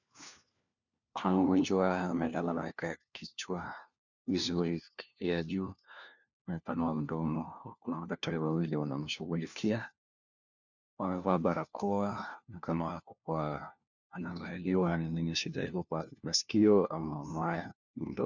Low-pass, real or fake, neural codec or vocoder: 7.2 kHz; fake; codec, 16 kHz, 1 kbps, FunCodec, trained on LibriTTS, 50 frames a second